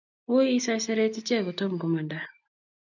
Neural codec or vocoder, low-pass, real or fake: none; 7.2 kHz; real